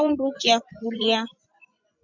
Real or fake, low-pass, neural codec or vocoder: fake; 7.2 kHz; vocoder, 24 kHz, 100 mel bands, Vocos